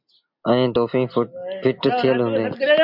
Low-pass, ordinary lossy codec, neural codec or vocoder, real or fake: 5.4 kHz; MP3, 48 kbps; none; real